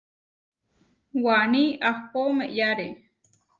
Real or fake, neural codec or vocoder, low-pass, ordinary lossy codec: real; none; 7.2 kHz; Opus, 32 kbps